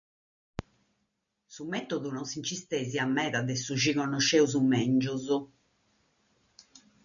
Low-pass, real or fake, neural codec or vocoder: 7.2 kHz; real; none